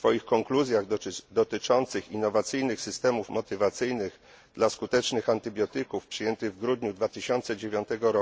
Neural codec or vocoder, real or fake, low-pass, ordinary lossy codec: none; real; none; none